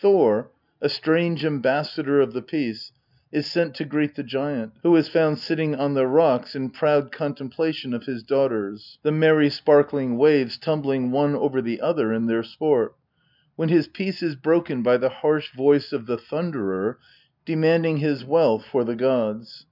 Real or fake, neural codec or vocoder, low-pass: real; none; 5.4 kHz